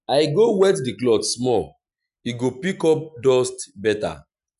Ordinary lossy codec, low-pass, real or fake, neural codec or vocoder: none; 10.8 kHz; real; none